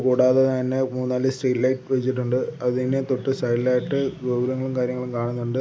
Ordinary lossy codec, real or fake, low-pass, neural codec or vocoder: none; real; none; none